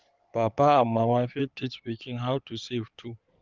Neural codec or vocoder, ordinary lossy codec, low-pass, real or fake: codec, 16 kHz in and 24 kHz out, 2.2 kbps, FireRedTTS-2 codec; Opus, 32 kbps; 7.2 kHz; fake